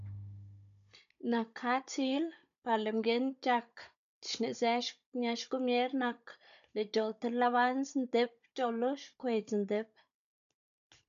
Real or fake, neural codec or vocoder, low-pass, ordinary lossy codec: fake; codec, 16 kHz, 16 kbps, FunCodec, trained on Chinese and English, 50 frames a second; 7.2 kHz; AAC, 96 kbps